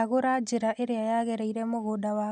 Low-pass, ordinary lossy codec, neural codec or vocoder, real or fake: 10.8 kHz; none; none; real